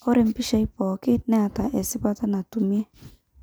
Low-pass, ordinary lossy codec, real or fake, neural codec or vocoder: none; none; real; none